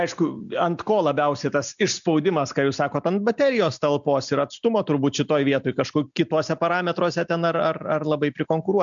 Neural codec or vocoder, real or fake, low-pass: none; real; 7.2 kHz